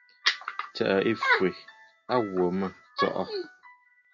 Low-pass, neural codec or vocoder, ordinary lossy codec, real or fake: 7.2 kHz; none; Opus, 64 kbps; real